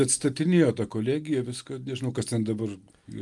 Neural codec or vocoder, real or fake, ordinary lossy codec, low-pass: none; real; Opus, 32 kbps; 10.8 kHz